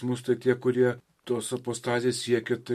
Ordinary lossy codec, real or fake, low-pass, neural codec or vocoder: MP3, 64 kbps; real; 14.4 kHz; none